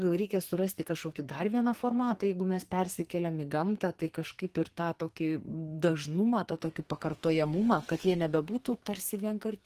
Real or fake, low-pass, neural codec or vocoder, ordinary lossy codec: fake; 14.4 kHz; codec, 44.1 kHz, 2.6 kbps, SNAC; Opus, 24 kbps